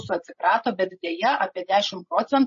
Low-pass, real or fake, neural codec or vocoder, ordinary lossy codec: 7.2 kHz; fake; codec, 16 kHz, 16 kbps, FreqCodec, larger model; MP3, 32 kbps